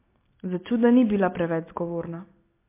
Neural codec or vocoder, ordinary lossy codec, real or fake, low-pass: none; MP3, 24 kbps; real; 3.6 kHz